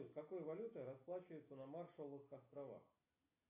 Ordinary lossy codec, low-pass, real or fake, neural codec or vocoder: AAC, 24 kbps; 3.6 kHz; real; none